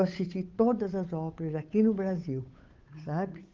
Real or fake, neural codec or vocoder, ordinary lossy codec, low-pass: fake; codec, 16 kHz, 8 kbps, FunCodec, trained on Chinese and English, 25 frames a second; Opus, 32 kbps; 7.2 kHz